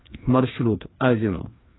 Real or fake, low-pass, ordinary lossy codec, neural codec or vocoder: fake; 7.2 kHz; AAC, 16 kbps; codec, 16 kHz, 2 kbps, FreqCodec, larger model